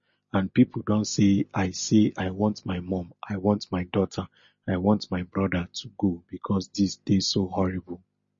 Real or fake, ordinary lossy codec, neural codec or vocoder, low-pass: real; MP3, 32 kbps; none; 7.2 kHz